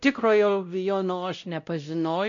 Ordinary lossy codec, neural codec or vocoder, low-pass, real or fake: MP3, 96 kbps; codec, 16 kHz, 0.5 kbps, X-Codec, WavLM features, trained on Multilingual LibriSpeech; 7.2 kHz; fake